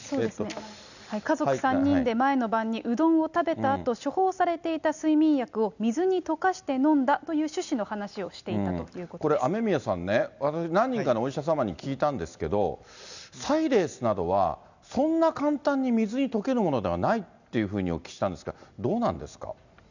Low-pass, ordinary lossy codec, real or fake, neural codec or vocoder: 7.2 kHz; none; real; none